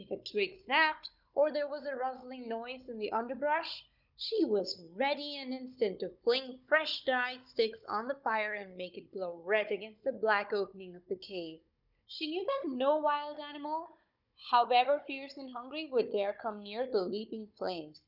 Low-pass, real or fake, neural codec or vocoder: 5.4 kHz; fake; codec, 16 kHz, 16 kbps, FunCodec, trained on Chinese and English, 50 frames a second